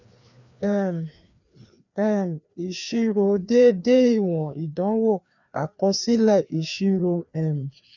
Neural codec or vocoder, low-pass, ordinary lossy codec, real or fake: codec, 16 kHz, 2 kbps, FreqCodec, larger model; 7.2 kHz; none; fake